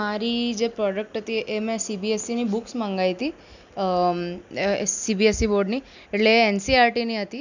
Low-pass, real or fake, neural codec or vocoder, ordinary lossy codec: 7.2 kHz; real; none; none